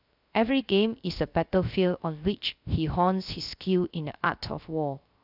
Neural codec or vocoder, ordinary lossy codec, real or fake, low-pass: codec, 16 kHz, 0.3 kbps, FocalCodec; none; fake; 5.4 kHz